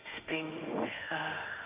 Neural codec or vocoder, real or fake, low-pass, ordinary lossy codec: autoencoder, 48 kHz, 32 numbers a frame, DAC-VAE, trained on Japanese speech; fake; 3.6 kHz; Opus, 24 kbps